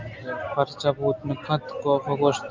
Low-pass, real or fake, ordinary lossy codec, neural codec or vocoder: 7.2 kHz; real; Opus, 24 kbps; none